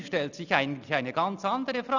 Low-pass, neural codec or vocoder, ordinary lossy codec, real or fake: 7.2 kHz; none; none; real